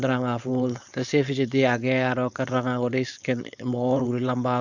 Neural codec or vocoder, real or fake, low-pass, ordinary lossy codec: codec, 16 kHz, 4.8 kbps, FACodec; fake; 7.2 kHz; none